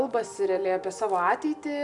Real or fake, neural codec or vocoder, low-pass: real; none; 10.8 kHz